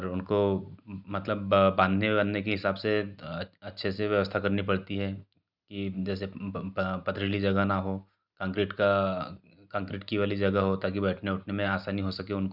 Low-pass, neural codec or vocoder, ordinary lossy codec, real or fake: 5.4 kHz; none; none; real